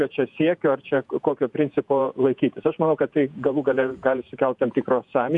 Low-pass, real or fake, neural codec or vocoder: 10.8 kHz; fake; vocoder, 48 kHz, 128 mel bands, Vocos